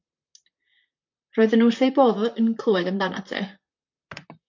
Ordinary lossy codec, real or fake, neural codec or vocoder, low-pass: MP3, 48 kbps; fake; vocoder, 44.1 kHz, 128 mel bands, Pupu-Vocoder; 7.2 kHz